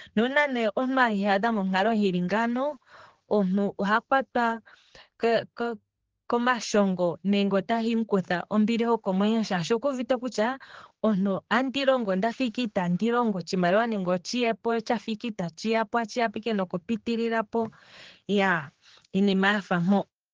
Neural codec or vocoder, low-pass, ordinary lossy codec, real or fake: codec, 16 kHz, 4 kbps, X-Codec, HuBERT features, trained on general audio; 7.2 kHz; Opus, 16 kbps; fake